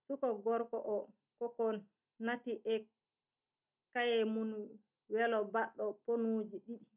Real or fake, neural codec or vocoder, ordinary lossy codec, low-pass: real; none; none; 3.6 kHz